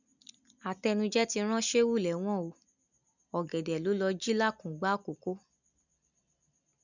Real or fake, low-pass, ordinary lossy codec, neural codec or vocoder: real; 7.2 kHz; none; none